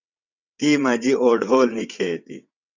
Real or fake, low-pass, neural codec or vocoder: fake; 7.2 kHz; vocoder, 22.05 kHz, 80 mel bands, WaveNeXt